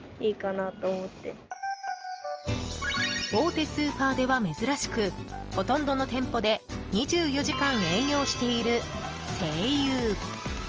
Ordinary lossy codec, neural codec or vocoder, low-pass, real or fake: Opus, 24 kbps; none; 7.2 kHz; real